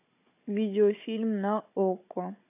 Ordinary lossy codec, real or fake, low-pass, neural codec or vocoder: AAC, 32 kbps; fake; 3.6 kHz; codec, 16 kHz, 16 kbps, FunCodec, trained on Chinese and English, 50 frames a second